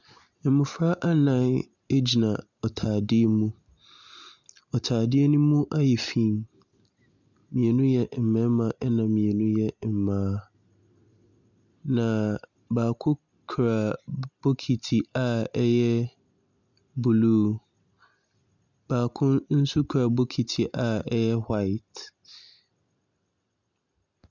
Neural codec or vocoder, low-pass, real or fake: none; 7.2 kHz; real